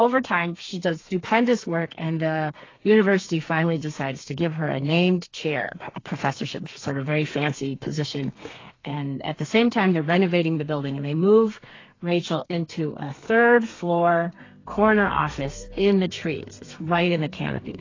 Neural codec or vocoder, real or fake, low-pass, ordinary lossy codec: codec, 32 kHz, 1.9 kbps, SNAC; fake; 7.2 kHz; AAC, 32 kbps